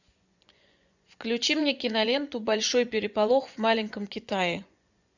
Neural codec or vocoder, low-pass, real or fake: vocoder, 24 kHz, 100 mel bands, Vocos; 7.2 kHz; fake